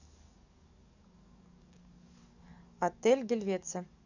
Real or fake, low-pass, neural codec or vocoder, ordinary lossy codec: fake; 7.2 kHz; codec, 44.1 kHz, 7.8 kbps, DAC; none